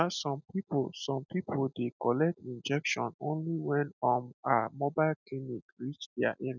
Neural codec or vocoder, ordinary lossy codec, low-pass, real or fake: none; none; 7.2 kHz; real